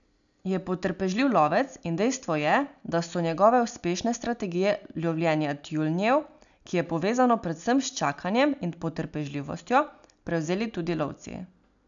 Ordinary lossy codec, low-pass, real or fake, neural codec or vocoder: none; 7.2 kHz; real; none